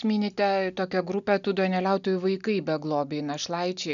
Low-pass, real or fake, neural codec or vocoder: 7.2 kHz; real; none